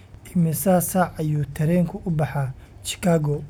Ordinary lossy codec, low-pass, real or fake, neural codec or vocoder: none; none; real; none